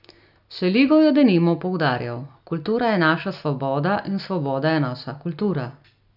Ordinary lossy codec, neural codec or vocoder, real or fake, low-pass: none; none; real; 5.4 kHz